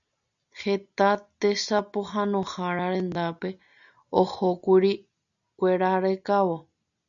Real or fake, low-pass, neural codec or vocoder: real; 7.2 kHz; none